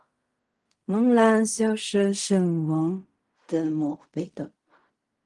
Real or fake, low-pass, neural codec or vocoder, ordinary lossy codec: fake; 10.8 kHz; codec, 16 kHz in and 24 kHz out, 0.4 kbps, LongCat-Audio-Codec, fine tuned four codebook decoder; Opus, 24 kbps